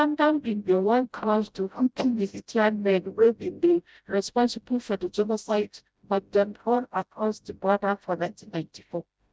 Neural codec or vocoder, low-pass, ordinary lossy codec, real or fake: codec, 16 kHz, 0.5 kbps, FreqCodec, smaller model; none; none; fake